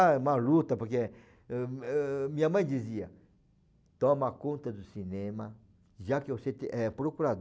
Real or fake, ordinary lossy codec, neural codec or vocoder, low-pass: real; none; none; none